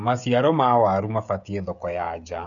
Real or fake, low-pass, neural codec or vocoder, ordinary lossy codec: fake; 7.2 kHz; codec, 16 kHz, 16 kbps, FreqCodec, smaller model; none